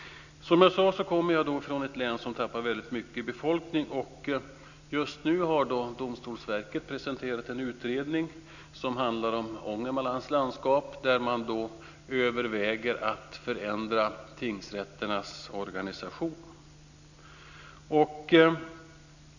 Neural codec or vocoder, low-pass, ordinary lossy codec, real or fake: none; 7.2 kHz; none; real